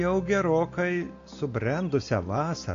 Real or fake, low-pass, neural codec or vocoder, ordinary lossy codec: real; 7.2 kHz; none; AAC, 48 kbps